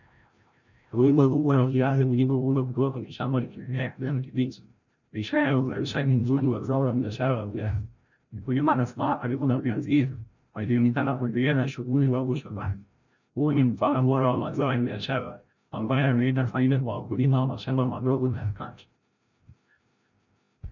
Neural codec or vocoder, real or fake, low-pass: codec, 16 kHz, 0.5 kbps, FreqCodec, larger model; fake; 7.2 kHz